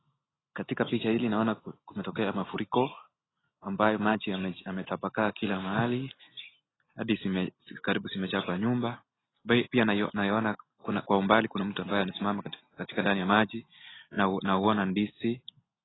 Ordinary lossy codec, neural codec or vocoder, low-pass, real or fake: AAC, 16 kbps; none; 7.2 kHz; real